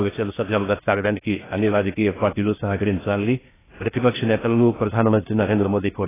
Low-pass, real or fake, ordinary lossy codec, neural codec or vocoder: 3.6 kHz; fake; AAC, 16 kbps; codec, 16 kHz in and 24 kHz out, 0.6 kbps, FocalCodec, streaming, 2048 codes